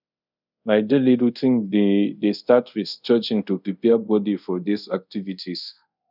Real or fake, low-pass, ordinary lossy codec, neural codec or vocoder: fake; 5.4 kHz; none; codec, 24 kHz, 0.5 kbps, DualCodec